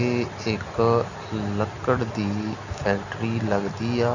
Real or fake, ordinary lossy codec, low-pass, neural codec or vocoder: real; none; 7.2 kHz; none